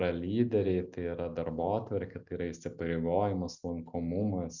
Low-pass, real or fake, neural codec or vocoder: 7.2 kHz; real; none